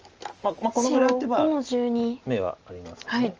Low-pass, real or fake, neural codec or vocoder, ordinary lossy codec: 7.2 kHz; real; none; Opus, 24 kbps